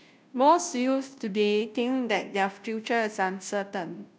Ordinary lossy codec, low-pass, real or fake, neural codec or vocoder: none; none; fake; codec, 16 kHz, 0.5 kbps, FunCodec, trained on Chinese and English, 25 frames a second